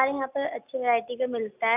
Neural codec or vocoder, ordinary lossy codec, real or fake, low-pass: none; none; real; 3.6 kHz